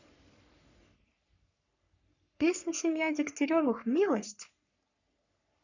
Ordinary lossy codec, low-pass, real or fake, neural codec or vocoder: none; 7.2 kHz; fake; codec, 44.1 kHz, 3.4 kbps, Pupu-Codec